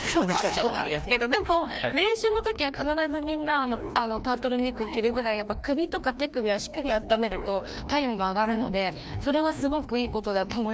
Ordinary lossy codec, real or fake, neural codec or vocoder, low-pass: none; fake; codec, 16 kHz, 1 kbps, FreqCodec, larger model; none